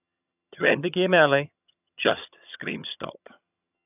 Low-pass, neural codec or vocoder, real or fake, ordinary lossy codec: 3.6 kHz; vocoder, 22.05 kHz, 80 mel bands, HiFi-GAN; fake; none